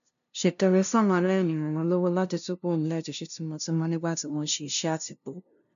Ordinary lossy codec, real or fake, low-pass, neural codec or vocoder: none; fake; 7.2 kHz; codec, 16 kHz, 0.5 kbps, FunCodec, trained on LibriTTS, 25 frames a second